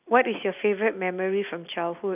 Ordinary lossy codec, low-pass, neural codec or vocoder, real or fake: none; 3.6 kHz; none; real